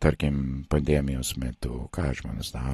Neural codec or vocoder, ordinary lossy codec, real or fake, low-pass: none; AAC, 32 kbps; real; 19.8 kHz